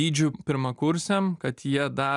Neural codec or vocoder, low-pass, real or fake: none; 10.8 kHz; real